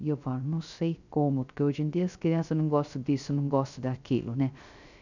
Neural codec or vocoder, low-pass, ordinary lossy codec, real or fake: codec, 16 kHz, 0.3 kbps, FocalCodec; 7.2 kHz; none; fake